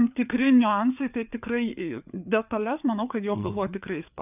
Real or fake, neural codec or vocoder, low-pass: fake; codec, 16 kHz, 4 kbps, FreqCodec, larger model; 3.6 kHz